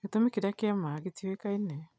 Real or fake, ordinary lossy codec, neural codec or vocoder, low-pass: real; none; none; none